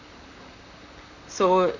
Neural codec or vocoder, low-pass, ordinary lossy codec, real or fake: vocoder, 44.1 kHz, 128 mel bands, Pupu-Vocoder; 7.2 kHz; Opus, 64 kbps; fake